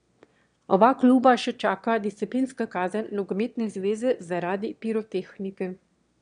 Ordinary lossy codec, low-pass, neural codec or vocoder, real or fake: MP3, 64 kbps; 9.9 kHz; autoencoder, 22.05 kHz, a latent of 192 numbers a frame, VITS, trained on one speaker; fake